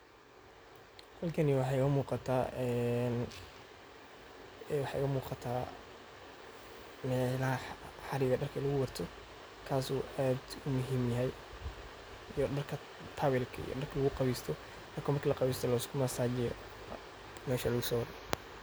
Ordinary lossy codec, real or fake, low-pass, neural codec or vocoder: none; real; none; none